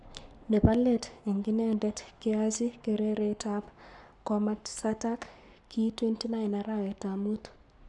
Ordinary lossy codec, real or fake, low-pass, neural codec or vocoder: none; fake; 10.8 kHz; codec, 44.1 kHz, 7.8 kbps, Pupu-Codec